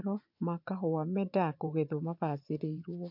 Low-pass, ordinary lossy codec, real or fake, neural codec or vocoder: 5.4 kHz; MP3, 48 kbps; fake; vocoder, 24 kHz, 100 mel bands, Vocos